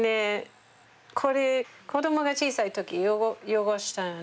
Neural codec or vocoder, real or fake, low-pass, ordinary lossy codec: none; real; none; none